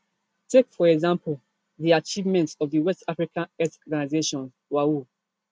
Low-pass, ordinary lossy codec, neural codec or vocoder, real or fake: none; none; none; real